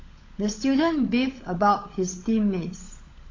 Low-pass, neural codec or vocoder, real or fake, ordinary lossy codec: 7.2 kHz; codec, 16 kHz, 16 kbps, FunCodec, trained on LibriTTS, 50 frames a second; fake; AAC, 32 kbps